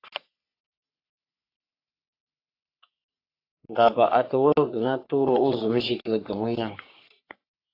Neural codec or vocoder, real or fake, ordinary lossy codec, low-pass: codec, 44.1 kHz, 3.4 kbps, Pupu-Codec; fake; AAC, 32 kbps; 5.4 kHz